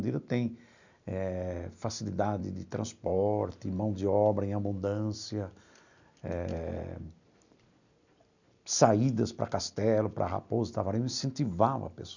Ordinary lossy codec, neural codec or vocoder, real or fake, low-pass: none; none; real; 7.2 kHz